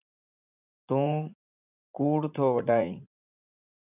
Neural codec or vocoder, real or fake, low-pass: vocoder, 44.1 kHz, 80 mel bands, Vocos; fake; 3.6 kHz